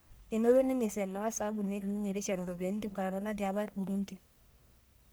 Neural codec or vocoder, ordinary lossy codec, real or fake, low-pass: codec, 44.1 kHz, 1.7 kbps, Pupu-Codec; none; fake; none